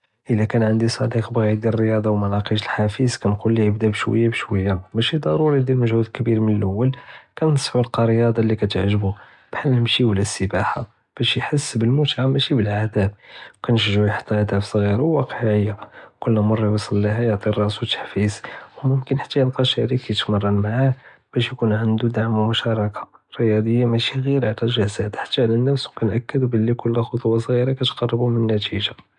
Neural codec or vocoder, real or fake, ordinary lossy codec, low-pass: none; real; none; 10.8 kHz